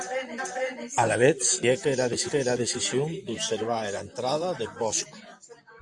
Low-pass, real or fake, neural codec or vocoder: 10.8 kHz; fake; vocoder, 44.1 kHz, 128 mel bands, Pupu-Vocoder